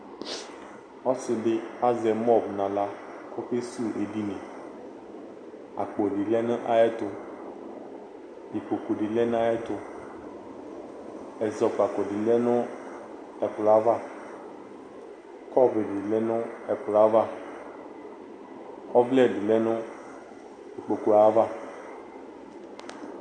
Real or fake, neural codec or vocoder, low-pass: real; none; 9.9 kHz